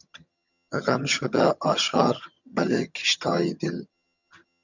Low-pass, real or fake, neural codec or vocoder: 7.2 kHz; fake; vocoder, 22.05 kHz, 80 mel bands, HiFi-GAN